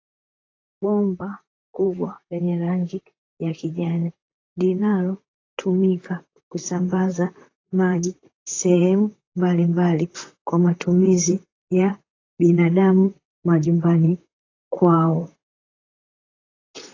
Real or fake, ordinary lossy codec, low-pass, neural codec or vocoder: fake; AAC, 32 kbps; 7.2 kHz; vocoder, 44.1 kHz, 128 mel bands, Pupu-Vocoder